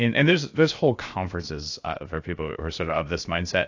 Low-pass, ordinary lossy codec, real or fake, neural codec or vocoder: 7.2 kHz; AAC, 48 kbps; fake; codec, 16 kHz, about 1 kbps, DyCAST, with the encoder's durations